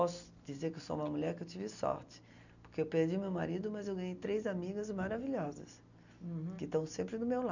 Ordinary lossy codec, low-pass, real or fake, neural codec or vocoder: none; 7.2 kHz; real; none